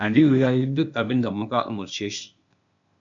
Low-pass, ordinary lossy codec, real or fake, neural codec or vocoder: 7.2 kHz; MP3, 96 kbps; fake; codec, 16 kHz, 0.8 kbps, ZipCodec